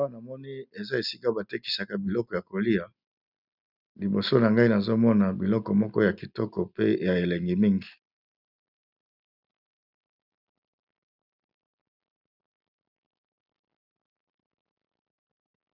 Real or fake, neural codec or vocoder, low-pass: real; none; 5.4 kHz